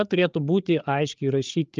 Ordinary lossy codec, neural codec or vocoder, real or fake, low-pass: Opus, 24 kbps; codec, 16 kHz, 8 kbps, FreqCodec, larger model; fake; 7.2 kHz